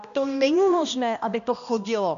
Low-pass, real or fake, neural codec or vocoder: 7.2 kHz; fake; codec, 16 kHz, 1 kbps, X-Codec, HuBERT features, trained on balanced general audio